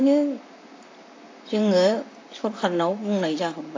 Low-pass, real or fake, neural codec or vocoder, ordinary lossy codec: 7.2 kHz; fake; vocoder, 44.1 kHz, 128 mel bands, Pupu-Vocoder; AAC, 32 kbps